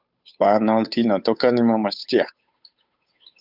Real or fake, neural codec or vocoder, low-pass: fake; codec, 16 kHz, 8 kbps, FunCodec, trained on Chinese and English, 25 frames a second; 5.4 kHz